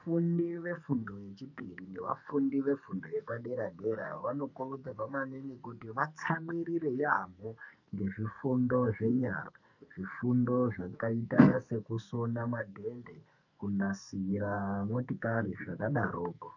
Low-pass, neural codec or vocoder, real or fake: 7.2 kHz; codec, 44.1 kHz, 2.6 kbps, SNAC; fake